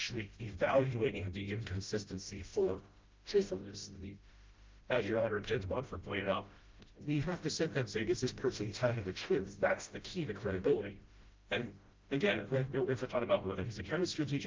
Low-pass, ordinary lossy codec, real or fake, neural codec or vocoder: 7.2 kHz; Opus, 24 kbps; fake; codec, 16 kHz, 0.5 kbps, FreqCodec, smaller model